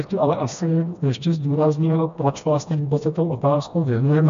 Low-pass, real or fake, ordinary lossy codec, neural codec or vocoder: 7.2 kHz; fake; MP3, 64 kbps; codec, 16 kHz, 1 kbps, FreqCodec, smaller model